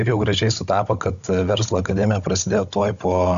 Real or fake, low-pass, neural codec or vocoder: fake; 7.2 kHz; codec, 16 kHz, 16 kbps, FunCodec, trained on Chinese and English, 50 frames a second